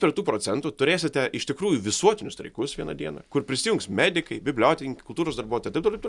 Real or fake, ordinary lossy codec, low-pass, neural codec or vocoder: real; Opus, 64 kbps; 10.8 kHz; none